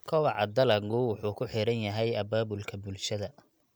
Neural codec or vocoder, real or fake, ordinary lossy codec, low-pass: none; real; none; none